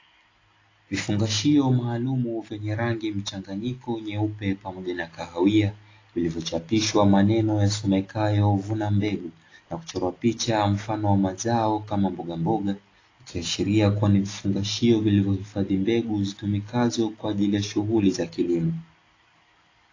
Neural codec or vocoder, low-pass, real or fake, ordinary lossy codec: none; 7.2 kHz; real; AAC, 32 kbps